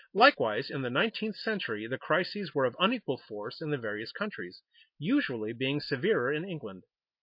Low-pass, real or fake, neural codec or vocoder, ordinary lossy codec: 5.4 kHz; real; none; MP3, 32 kbps